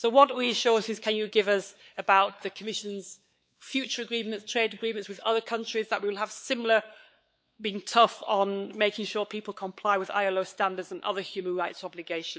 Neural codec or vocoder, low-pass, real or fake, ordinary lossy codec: codec, 16 kHz, 4 kbps, X-Codec, WavLM features, trained on Multilingual LibriSpeech; none; fake; none